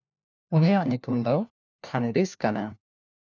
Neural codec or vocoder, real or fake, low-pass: codec, 16 kHz, 1 kbps, FunCodec, trained on LibriTTS, 50 frames a second; fake; 7.2 kHz